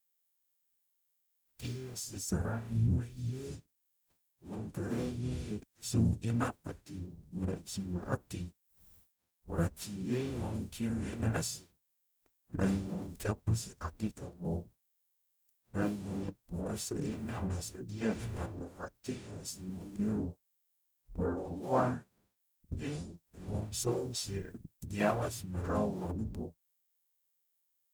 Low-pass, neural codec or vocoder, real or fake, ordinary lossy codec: none; codec, 44.1 kHz, 0.9 kbps, DAC; fake; none